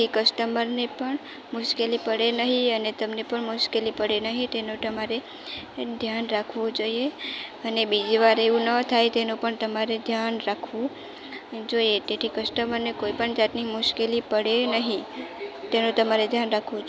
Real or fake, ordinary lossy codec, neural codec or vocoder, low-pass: real; none; none; none